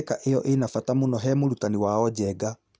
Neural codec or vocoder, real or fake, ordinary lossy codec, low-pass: none; real; none; none